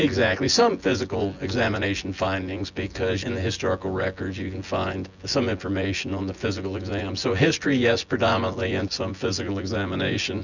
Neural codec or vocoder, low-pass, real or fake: vocoder, 24 kHz, 100 mel bands, Vocos; 7.2 kHz; fake